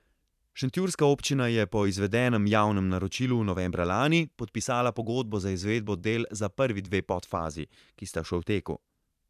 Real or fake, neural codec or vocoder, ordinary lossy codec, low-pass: fake; vocoder, 44.1 kHz, 128 mel bands every 512 samples, BigVGAN v2; none; 14.4 kHz